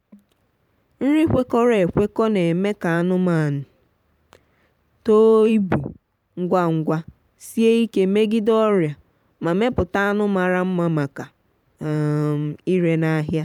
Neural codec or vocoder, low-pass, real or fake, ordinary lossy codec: vocoder, 44.1 kHz, 128 mel bands every 512 samples, BigVGAN v2; 19.8 kHz; fake; none